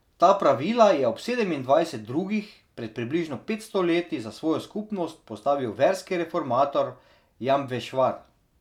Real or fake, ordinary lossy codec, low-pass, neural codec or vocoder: real; none; 19.8 kHz; none